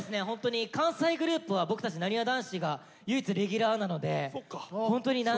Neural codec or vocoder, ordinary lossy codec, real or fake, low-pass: none; none; real; none